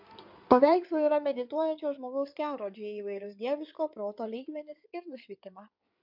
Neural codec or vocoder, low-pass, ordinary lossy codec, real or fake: codec, 16 kHz in and 24 kHz out, 2.2 kbps, FireRedTTS-2 codec; 5.4 kHz; MP3, 48 kbps; fake